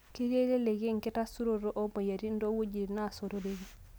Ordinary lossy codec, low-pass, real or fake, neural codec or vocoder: none; none; real; none